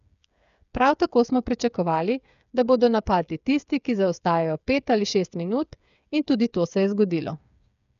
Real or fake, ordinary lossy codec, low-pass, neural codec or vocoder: fake; none; 7.2 kHz; codec, 16 kHz, 8 kbps, FreqCodec, smaller model